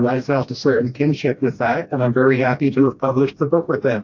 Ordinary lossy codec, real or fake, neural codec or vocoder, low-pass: AAC, 48 kbps; fake; codec, 16 kHz, 1 kbps, FreqCodec, smaller model; 7.2 kHz